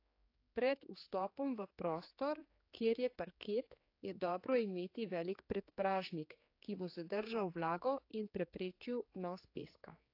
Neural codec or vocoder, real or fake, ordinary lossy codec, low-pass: codec, 16 kHz, 4 kbps, X-Codec, HuBERT features, trained on general audio; fake; AAC, 32 kbps; 5.4 kHz